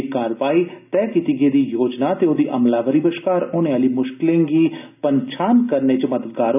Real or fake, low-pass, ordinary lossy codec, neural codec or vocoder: real; 3.6 kHz; none; none